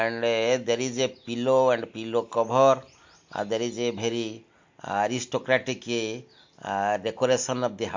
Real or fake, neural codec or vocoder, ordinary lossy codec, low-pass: real; none; MP3, 48 kbps; 7.2 kHz